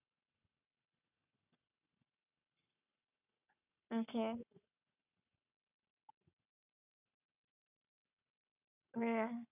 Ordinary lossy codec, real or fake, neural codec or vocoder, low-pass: none; fake; codec, 44.1 kHz, 7.8 kbps, Pupu-Codec; 3.6 kHz